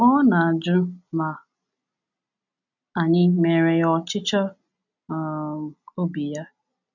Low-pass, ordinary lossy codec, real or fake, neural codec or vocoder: 7.2 kHz; none; real; none